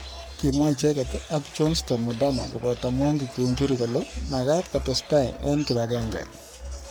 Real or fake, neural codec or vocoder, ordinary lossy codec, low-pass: fake; codec, 44.1 kHz, 3.4 kbps, Pupu-Codec; none; none